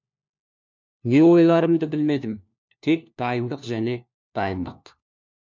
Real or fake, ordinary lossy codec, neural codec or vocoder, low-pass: fake; MP3, 64 kbps; codec, 16 kHz, 1 kbps, FunCodec, trained on LibriTTS, 50 frames a second; 7.2 kHz